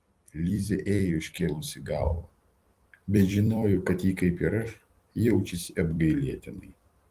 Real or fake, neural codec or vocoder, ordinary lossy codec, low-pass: fake; vocoder, 44.1 kHz, 128 mel bands every 256 samples, BigVGAN v2; Opus, 24 kbps; 14.4 kHz